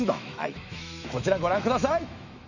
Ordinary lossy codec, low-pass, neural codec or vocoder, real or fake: none; 7.2 kHz; autoencoder, 48 kHz, 128 numbers a frame, DAC-VAE, trained on Japanese speech; fake